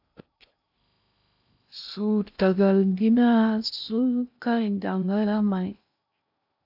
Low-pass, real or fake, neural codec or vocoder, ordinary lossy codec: 5.4 kHz; fake; codec, 16 kHz in and 24 kHz out, 0.6 kbps, FocalCodec, streaming, 2048 codes; AAC, 48 kbps